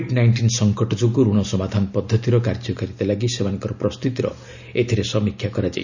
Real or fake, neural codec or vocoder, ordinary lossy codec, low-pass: real; none; none; 7.2 kHz